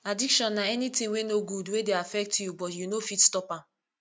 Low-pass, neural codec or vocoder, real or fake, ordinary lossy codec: none; none; real; none